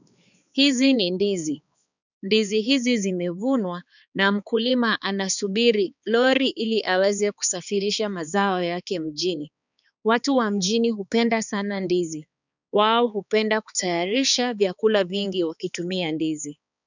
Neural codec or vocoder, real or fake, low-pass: codec, 16 kHz, 4 kbps, X-Codec, HuBERT features, trained on balanced general audio; fake; 7.2 kHz